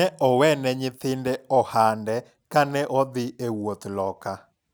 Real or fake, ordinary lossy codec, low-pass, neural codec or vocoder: real; none; none; none